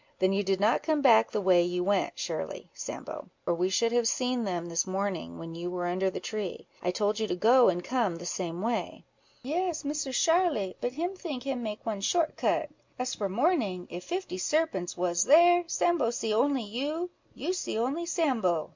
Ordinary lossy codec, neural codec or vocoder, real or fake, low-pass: MP3, 64 kbps; none; real; 7.2 kHz